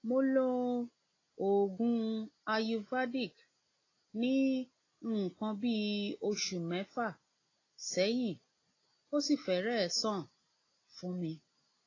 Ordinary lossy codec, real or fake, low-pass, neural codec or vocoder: AAC, 32 kbps; real; 7.2 kHz; none